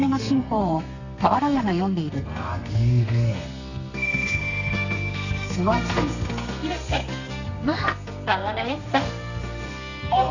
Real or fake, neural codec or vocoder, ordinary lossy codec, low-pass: fake; codec, 32 kHz, 1.9 kbps, SNAC; none; 7.2 kHz